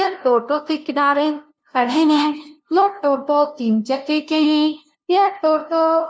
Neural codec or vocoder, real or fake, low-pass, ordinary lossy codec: codec, 16 kHz, 0.5 kbps, FunCodec, trained on LibriTTS, 25 frames a second; fake; none; none